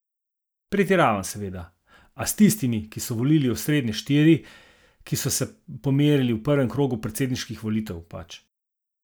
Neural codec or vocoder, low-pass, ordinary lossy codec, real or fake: none; none; none; real